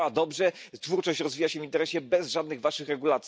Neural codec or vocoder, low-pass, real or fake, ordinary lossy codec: none; none; real; none